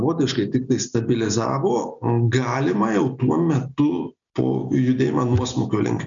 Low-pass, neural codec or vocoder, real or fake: 7.2 kHz; none; real